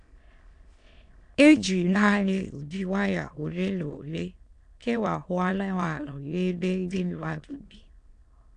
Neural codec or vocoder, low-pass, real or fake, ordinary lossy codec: autoencoder, 22.05 kHz, a latent of 192 numbers a frame, VITS, trained on many speakers; 9.9 kHz; fake; AAC, 64 kbps